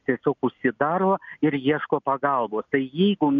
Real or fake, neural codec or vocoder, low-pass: real; none; 7.2 kHz